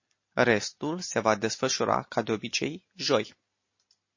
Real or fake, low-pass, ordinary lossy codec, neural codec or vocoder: real; 7.2 kHz; MP3, 32 kbps; none